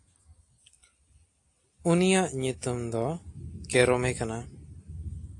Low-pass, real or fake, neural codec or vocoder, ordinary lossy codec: 10.8 kHz; real; none; AAC, 48 kbps